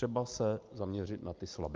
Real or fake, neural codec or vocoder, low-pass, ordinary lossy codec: real; none; 7.2 kHz; Opus, 24 kbps